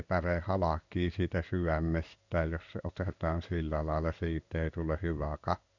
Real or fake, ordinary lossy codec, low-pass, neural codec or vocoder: fake; none; 7.2 kHz; codec, 16 kHz in and 24 kHz out, 1 kbps, XY-Tokenizer